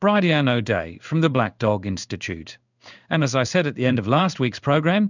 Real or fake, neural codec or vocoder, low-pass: fake; codec, 16 kHz in and 24 kHz out, 1 kbps, XY-Tokenizer; 7.2 kHz